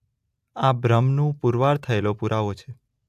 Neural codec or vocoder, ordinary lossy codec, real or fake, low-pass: none; AAC, 96 kbps; real; 14.4 kHz